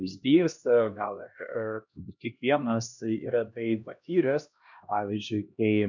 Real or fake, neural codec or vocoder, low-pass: fake; codec, 16 kHz, 1 kbps, X-Codec, HuBERT features, trained on LibriSpeech; 7.2 kHz